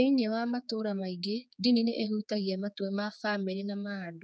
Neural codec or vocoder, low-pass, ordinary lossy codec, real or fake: codec, 16 kHz, 4 kbps, X-Codec, HuBERT features, trained on general audio; none; none; fake